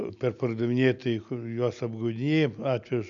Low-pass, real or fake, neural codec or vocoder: 7.2 kHz; real; none